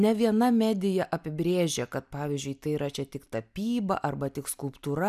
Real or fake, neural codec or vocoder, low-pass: real; none; 14.4 kHz